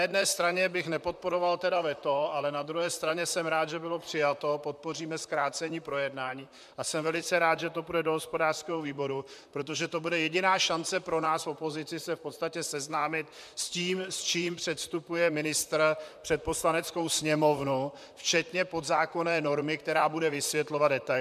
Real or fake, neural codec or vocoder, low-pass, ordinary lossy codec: fake; vocoder, 44.1 kHz, 128 mel bands, Pupu-Vocoder; 14.4 kHz; MP3, 96 kbps